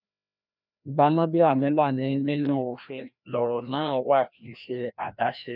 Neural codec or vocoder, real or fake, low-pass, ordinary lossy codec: codec, 16 kHz, 1 kbps, FreqCodec, larger model; fake; 5.4 kHz; none